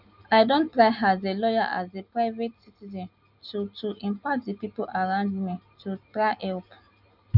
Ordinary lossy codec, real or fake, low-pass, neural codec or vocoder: none; real; 5.4 kHz; none